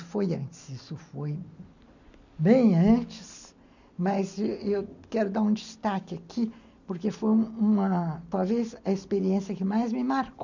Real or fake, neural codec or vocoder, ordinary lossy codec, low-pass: real; none; none; 7.2 kHz